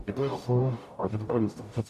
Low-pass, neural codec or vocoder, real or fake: 14.4 kHz; codec, 44.1 kHz, 0.9 kbps, DAC; fake